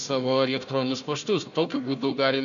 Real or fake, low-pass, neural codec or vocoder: fake; 7.2 kHz; codec, 16 kHz, 1 kbps, FunCodec, trained on Chinese and English, 50 frames a second